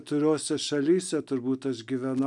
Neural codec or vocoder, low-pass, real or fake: none; 10.8 kHz; real